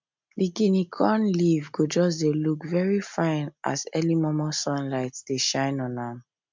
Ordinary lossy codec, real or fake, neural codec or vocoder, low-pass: MP3, 64 kbps; real; none; 7.2 kHz